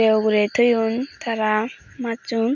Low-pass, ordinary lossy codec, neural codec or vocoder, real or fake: 7.2 kHz; none; none; real